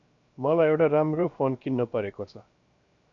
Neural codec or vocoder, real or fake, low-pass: codec, 16 kHz, 0.7 kbps, FocalCodec; fake; 7.2 kHz